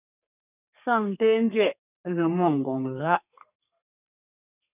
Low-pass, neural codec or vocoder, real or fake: 3.6 kHz; codec, 32 kHz, 1.9 kbps, SNAC; fake